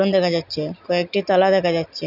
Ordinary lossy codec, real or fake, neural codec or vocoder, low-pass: none; real; none; 5.4 kHz